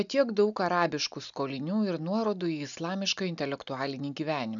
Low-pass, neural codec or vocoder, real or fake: 7.2 kHz; none; real